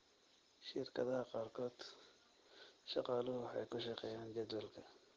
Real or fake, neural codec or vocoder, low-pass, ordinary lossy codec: real; none; 7.2 kHz; Opus, 16 kbps